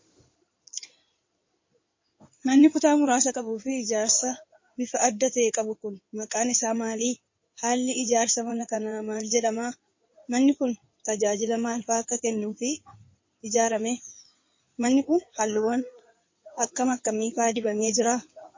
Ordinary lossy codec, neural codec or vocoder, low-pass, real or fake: MP3, 32 kbps; codec, 16 kHz in and 24 kHz out, 2.2 kbps, FireRedTTS-2 codec; 7.2 kHz; fake